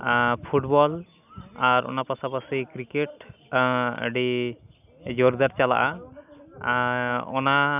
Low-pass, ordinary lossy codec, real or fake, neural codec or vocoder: 3.6 kHz; none; real; none